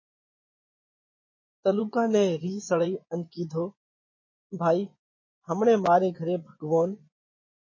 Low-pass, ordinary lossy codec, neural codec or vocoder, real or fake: 7.2 kHz; MP3, 32 kbps; vocoder, 24 kHz, 100 mel bands, Vocos; fake